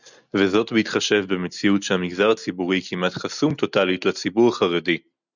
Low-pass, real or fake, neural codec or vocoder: 7.2 kHz; real; none